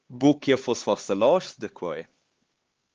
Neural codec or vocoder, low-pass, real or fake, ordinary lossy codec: codec, 16 kHz, 4 kbps, X-Codec, HuBERT features, trained on LibriSpeech; 7.2 kHz; fake; Opus, 16 kbps